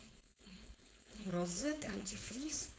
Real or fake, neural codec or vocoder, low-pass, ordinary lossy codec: fake; codec, 16 kHz, 4.8 kbps, FACodec; none; none